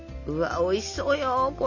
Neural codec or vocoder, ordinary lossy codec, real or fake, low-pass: none; none; real; 7.2 kHz